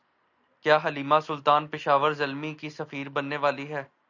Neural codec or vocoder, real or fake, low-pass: none; real; 7.2 kHz